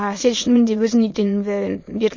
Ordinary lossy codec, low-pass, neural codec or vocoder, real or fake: MP3, 32 kbps; 7.2 kHz; autoencoder, 22.05 kHz, a latent of 192 numbers a frame, VITS, trained on many speakers; fake